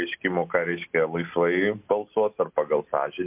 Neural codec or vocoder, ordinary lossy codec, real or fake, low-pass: none; MP3, 32 kbps; real; 3.6 kHz